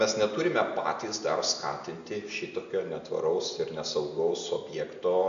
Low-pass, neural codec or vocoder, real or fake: 7.2 kHz; none; real